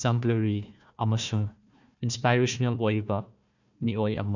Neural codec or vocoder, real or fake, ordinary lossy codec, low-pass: codec, 16 kHz, 1 kbps, FunCodec, trained on Chinese and English, 50 frames a second; fake; none; 7.2 kHz